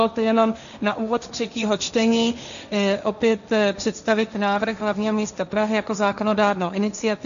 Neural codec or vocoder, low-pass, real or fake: codec, 16 kHz, 1.1 kbps, Voila-Tokenizer; 7.2 kHz; fake